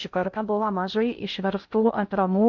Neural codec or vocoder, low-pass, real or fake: codec, 16 kHz in and 24 kHz out, 0.6 kbps, FocalCodec, streaming, 2048 codes; 7.2 kHz; fake